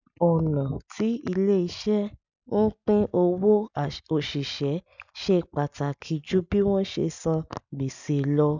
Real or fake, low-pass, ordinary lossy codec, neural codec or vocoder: real; 7.2 kHz; none; none